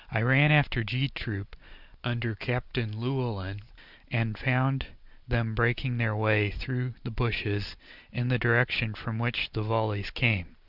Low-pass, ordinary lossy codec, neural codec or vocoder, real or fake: 5.4 kHz; Opus, 64 kbps; none; real